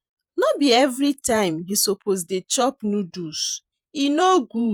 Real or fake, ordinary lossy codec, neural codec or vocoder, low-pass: fake; none; vocoder, 48 kHz, 128 mel bands, Vocos; none